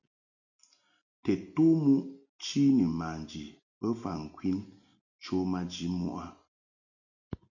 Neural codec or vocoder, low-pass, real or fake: none; 7.2 kHz; real